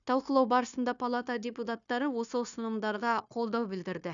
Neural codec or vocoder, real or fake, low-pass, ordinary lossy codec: codec, 16 kHz, 0.9 kbps, LongCat-Audio-Codec; fake; 7.2 kHz; none